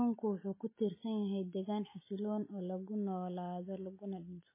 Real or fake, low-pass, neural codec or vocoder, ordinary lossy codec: real; 3.6 kHz; none; MP3, 16 kbps